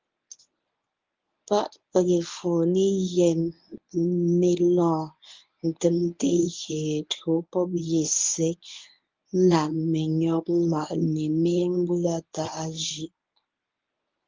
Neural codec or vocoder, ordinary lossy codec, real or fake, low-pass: codec, 24 kHz, 0.9 kbps, WavTokenizer, medium speech release version 1; Opus, 24 kbps; fake; 7.2 kHz